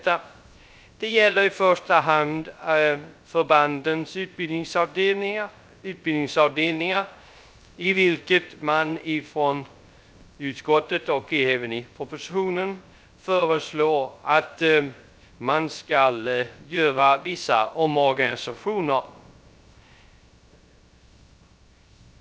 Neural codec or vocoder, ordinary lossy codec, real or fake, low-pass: codec, 16 kHz, 0.3 kbps, FocalCodec; none; fake; none